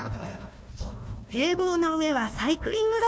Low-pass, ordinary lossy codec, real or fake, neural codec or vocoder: none; none; fake; codec, 16 kHz, 1 kbps, FunCodec, trained on Chinese and English, 50 frames a second